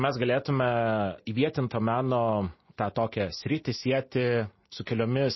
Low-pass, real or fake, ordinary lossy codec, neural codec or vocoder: 7.2 kHz; real; MP3, 24 kbps; none